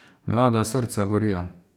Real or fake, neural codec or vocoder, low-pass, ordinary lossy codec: fake; codec, 44.1 kHz, 2.6 kbps, DAC; 19.8 kHz; none